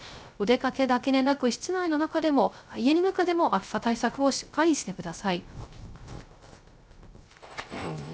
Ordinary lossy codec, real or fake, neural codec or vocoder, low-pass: none; fake; codec, 16 kHz, 0.3 kbps, FocalCodec; none